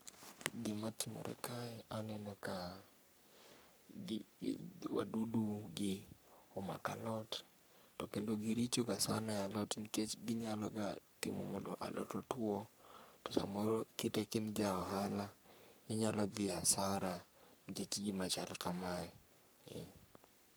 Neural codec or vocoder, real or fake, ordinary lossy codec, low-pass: codec, 44.1 kHz, 3.4 kbps, Pupu-Codec; fake; none; none